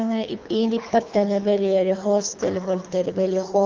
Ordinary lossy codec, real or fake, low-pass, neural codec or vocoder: Opus, 24 kbps; fake; 7.2 kHz; codec, 24 kHz, 3 kbps, HILCodec